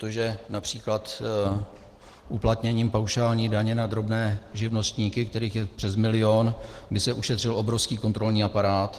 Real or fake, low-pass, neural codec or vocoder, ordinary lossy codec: real; 14.4 kHz; none; Opus, 16 kbps